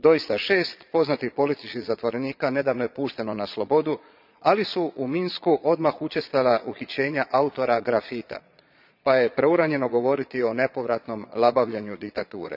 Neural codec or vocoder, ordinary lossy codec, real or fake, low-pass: vocoder, 22.05 kHz, 80 mel bands, Vocos; none; fake; 5.4 kHz